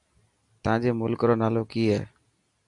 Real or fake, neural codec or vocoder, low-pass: real; none; 10.8 kHz